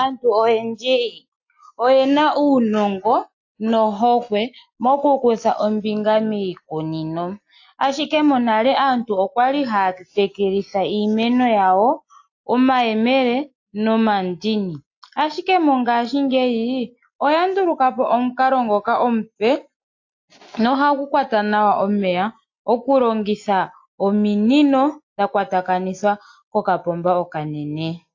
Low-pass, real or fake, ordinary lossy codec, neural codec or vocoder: 7.2 kHz; real; AAC, 48 kbps; none